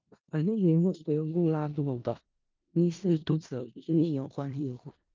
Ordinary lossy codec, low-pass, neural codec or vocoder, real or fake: Opus, 24 kbps; 7.2 kHz; codec, 16 kHz in and 24 kHz out, 0.4 kbps, LongCat-Audio-Codec, four codebook decoder; fake